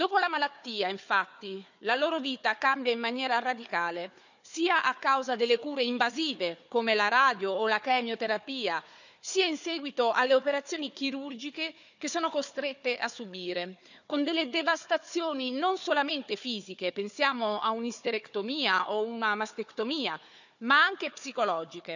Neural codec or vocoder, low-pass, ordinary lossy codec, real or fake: codec, 16 kHz, 4 kbps, FunCodec, trained on Chinese and English, 50 frames a second; 7.2 kHz; none; fake